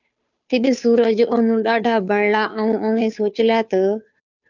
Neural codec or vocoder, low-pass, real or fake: codec, 16 kHz, 2 kbps, FunCodec, trained on Chinese and English, 25 frames a second; 7.2 kHz; fake